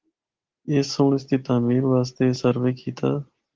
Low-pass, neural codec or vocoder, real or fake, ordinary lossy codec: 7.2 kHz; none; real; Opus, 16 kbps